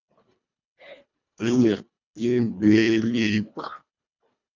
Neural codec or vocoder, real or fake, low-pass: codec, 24 kHz, 1.5 kbps, HILCodec; fake; 7.2 kHz